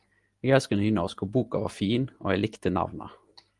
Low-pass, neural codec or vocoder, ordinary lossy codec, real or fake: 10.8 kHz; none; Opus, 24 kbps; real